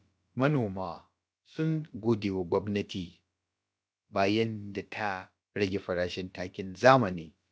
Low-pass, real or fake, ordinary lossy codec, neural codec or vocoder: none; fake; none; codec, 16 kHz, about 1 kbps, DyCAST, with the encoder's durations